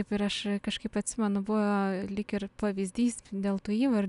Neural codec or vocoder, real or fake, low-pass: none; real; 10.8 kHz